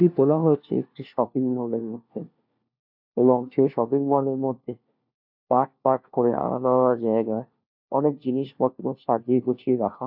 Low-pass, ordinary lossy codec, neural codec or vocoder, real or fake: 5.4 kHz; AAC, 48 kbps; codec, 16 kHz, 1 kbps, FunCodec, trained on LibriTTS, 50 frames a second; fake